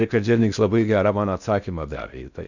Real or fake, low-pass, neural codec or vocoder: fake; 7.2 kHz; codec, 16 kHz in and 24 kHz out, 0.6 kbps, FocalCodec, streaming, 4096 codes